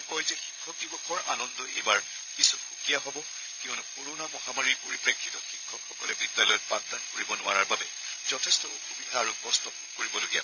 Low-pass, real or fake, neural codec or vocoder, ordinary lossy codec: 7.2 kHz; fake; codec, 16 kHz, 16 kbps, FreqCodec, larger model; none